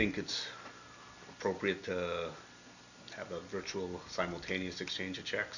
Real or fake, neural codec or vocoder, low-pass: real; none; 7.2 kHz